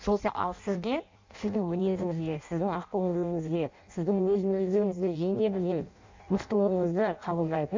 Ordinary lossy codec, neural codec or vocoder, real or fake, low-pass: MP3, 64 kbps; codec, 16 kHz in and 24 kHz out, 0.6 kbps, FireRedTTS-2 codec; fake; 7.2 kHz